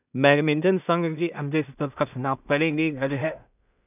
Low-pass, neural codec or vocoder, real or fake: 3.6 kHz; codec, 16 kHz in and 24 kHz out, 0.4 kbps, LongCat-Audio-Codec, two codebook decoder; fake